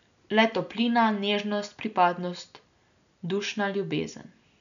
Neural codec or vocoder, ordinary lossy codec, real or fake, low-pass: none; none; real; 7.2 kHz